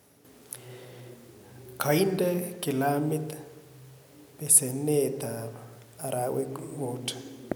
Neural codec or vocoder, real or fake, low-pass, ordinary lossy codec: none; real; none; none